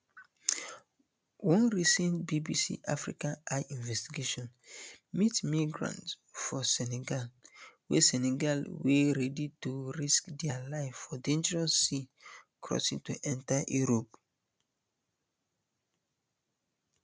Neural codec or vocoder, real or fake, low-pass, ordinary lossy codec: none; real; none; none